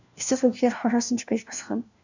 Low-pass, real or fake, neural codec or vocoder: 7.2 kHz; fake; codec, 16 kHz, 1 kbps, FunCodec, trained on LibriTTS, 50 frames a second